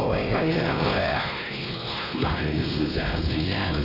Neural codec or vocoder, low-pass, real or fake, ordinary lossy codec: codec, 16 kHz, 1 kbps, X-Codec, WavLM features, trained on Multilingual LibriSpeech; 5.4 kHz; fake; MP3, 32 kbps